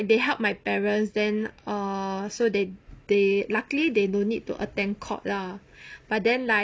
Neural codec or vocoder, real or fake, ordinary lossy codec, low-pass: none; real; none; none